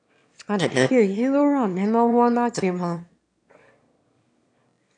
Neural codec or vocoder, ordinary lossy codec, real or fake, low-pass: autoencoder, 22.05 kHz, a latent of 192 numbers a frame, VITS, trained on one speaker; AAC, 64 kbps; fake; 9.9 kHz